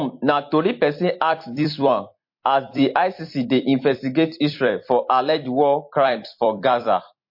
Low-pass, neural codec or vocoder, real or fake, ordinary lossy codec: 5.4 kHz; vocoder, 44.1 kHz, 128 mel bands every 256 samples, BigVGAN v2; fake; MP3, 32 kbps